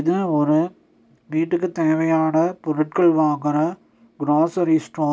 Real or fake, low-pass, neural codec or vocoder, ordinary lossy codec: real; none; none; none